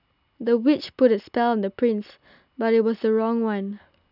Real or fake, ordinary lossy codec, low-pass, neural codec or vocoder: real; none; 5.4 kHz; none